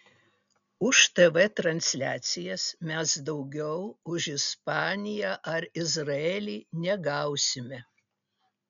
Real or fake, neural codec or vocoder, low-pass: real; none; 7.2 kHz